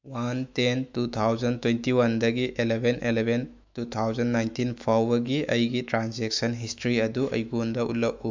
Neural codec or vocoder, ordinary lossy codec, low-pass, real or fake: autoencoder, 48 kHz, 128 numbers a frame, DAC-VAE, trained on Japanese speech; MP3, 64 kbps; 7.2 kHz; fake